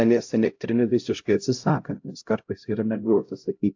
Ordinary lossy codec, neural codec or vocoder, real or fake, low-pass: AAC, 48 kbps; codec, 16 kHz, 0.5 kbps, X-Codec, HuBERT features, trained on LibriSpeech; fake; 7.2 kHz